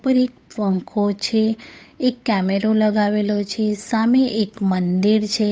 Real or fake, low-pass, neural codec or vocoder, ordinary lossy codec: fake; none; codec, 16 kHz, 8 kbps, FunCodec, trained on Chinese and English, 25 frames a second; none